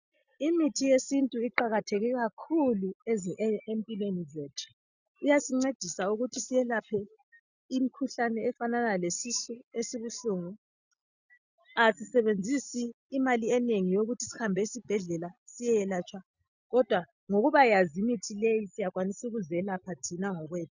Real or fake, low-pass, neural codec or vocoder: real; 7.2 kHz; none